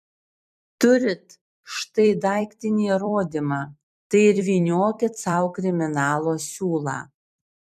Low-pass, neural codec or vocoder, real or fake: 14.4 kHz; vocoder, 44.1 kHz, 128 mel bands every 256 samples, BigVGAN v2; fake